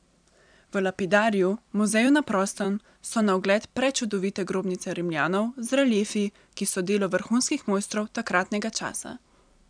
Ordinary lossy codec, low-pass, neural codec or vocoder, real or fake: none; 9.9 kHz; vocoder, 44.1 kHz, 128 mel bands every 256 samples, BigVGAN v2; fake